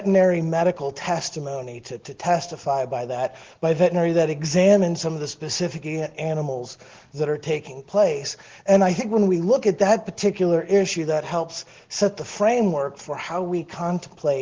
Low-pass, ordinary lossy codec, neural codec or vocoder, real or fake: 7.2 kHz; Opus, 16 kbps; none; real